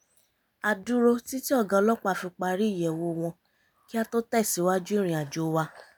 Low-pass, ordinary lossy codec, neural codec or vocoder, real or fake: none; none; none; real